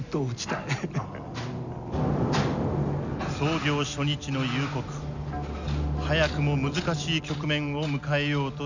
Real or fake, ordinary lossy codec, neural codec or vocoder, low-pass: real; none; none; 7.2 kHz